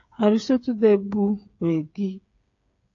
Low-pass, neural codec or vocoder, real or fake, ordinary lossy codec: 7.2 kHz; codec, 16 kHz, 8 kbps, FreqCodec, smaller model; fake; AAC, 48 kbps